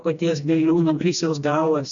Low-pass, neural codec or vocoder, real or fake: 7.2 kHz; codec, 16 kHz, 1 kbps, FreqCodec, smaller model; fake